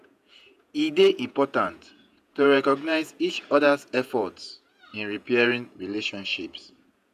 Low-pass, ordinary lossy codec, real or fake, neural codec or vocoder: 14.4 kHz; none; fake; vocoder, 48 kHz, 128 mel bands, Vocos